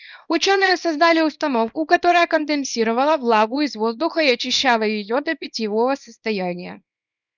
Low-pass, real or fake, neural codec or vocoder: 7.2 kHz; fake; codec, 24 kHz, 0.9 kbps, WavTokenizer, small release